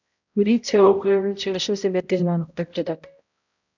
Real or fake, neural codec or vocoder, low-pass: fake; codec, 16 kHz, 0.5 kbps, X-Codec, HuBERT features, trained on balanced general audio; 7.2 kHz